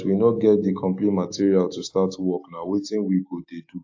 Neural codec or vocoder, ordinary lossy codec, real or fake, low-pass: codec, 16 kHz, 6 kbps, DAC; AAC, 48 kbps; fake; 7.2 kHz